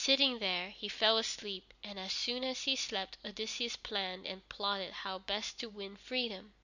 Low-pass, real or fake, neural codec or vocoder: 7.2 kHz; real; none